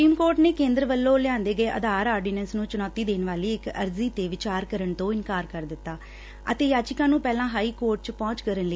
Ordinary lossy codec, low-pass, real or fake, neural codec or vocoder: none; none; real; none